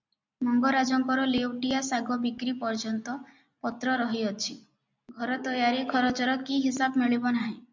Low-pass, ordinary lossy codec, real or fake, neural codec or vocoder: 7.2 kHz; MP3, 64 kbps; real; none